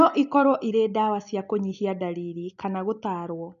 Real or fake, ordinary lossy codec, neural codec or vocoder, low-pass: real; MP3, 64 kbps; none; 7.2 kHz